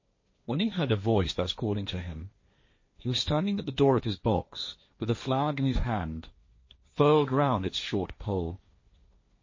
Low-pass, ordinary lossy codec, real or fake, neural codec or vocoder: 7.2 kHz; MP3, 32 kbps; fake; codec, 16 kHz, 1.1 kbps, Voila-Tokenizer